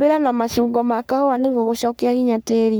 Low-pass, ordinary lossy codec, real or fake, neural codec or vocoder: none; none; fake; codec, 44.1 kHz, 3.4 kbps, Pupu-Codec